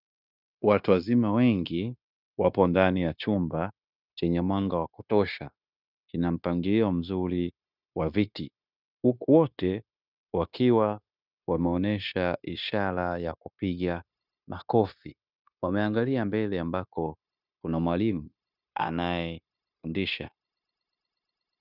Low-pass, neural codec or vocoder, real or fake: 5.4 kHz; codec, 16 kHz, 0.9 kbps, LongCat-Audio-Codec; fake